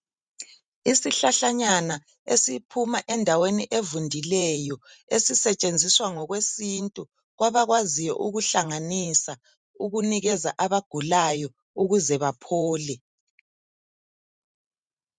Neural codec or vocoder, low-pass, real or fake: vocoder, 44.1 kHz, 128 mel bands every 512 samples, BigVGAN v2; 9.9 kHz; fake